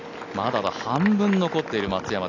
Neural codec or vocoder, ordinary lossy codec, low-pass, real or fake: none; none; 7.2 kHz; real